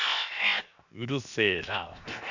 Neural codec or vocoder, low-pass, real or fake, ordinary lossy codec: codec, 16 kHz, 0.7 kbps, FocalCodec; 7.2 kHz; fake; none